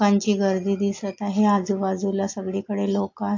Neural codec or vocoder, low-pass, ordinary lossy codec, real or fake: none; 7.2 kHz; AAC, 48 kbps; real